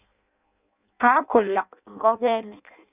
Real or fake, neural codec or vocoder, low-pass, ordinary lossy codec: fake; codec, 16 kHz in and 24 kHz out, 0.6 kbps, FireRedTTS-2 codec; 3.6 kHz; none